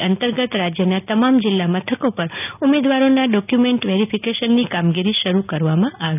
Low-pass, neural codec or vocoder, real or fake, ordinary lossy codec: 3.6 kHz; none; real; none